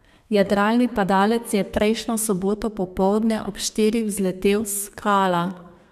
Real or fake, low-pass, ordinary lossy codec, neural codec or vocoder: fake; 14.4 kHz; none; codec, 32 kHz, 1.9 kbps, SNAC